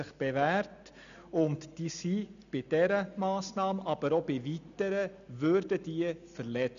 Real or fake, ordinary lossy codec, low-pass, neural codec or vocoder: real; none; 7.2 kHz; none